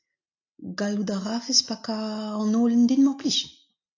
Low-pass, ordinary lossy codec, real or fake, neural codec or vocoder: 7.2 kHz; AAC, 48 kbps; real; none